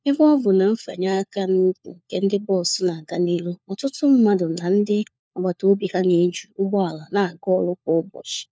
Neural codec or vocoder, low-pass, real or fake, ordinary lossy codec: codec, 16 kHz, 4 kbps, FunCodec, trained on LibriTTS, 50 frames a second; none; fake; none